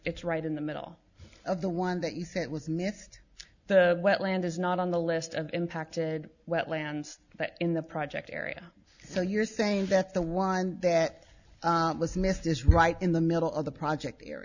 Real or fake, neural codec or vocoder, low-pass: real; none; 7.2 kHz